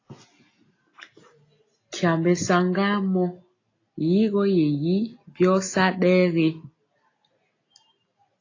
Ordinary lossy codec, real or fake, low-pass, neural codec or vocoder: AAC, 32 kbps; real; 7.2 kHz; none